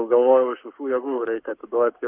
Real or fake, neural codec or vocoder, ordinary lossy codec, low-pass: fake; codec, 44.1 kHz, 7.8 kbps, Pupu-Codec; Opus, 32 kbps; 3.6 kHz